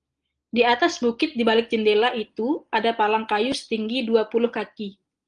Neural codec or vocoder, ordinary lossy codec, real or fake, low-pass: none; Opus, 24 kbps; real; 9.9 kHz